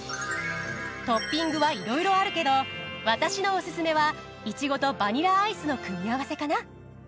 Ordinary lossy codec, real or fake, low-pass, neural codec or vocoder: none; real; none; none